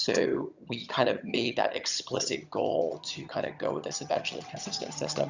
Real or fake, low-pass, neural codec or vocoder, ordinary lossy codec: fake; 7.2 kHz; vocoder, 22.05 kHz, 80 mel bands, HiFi-GAN; Opus, 64 kbps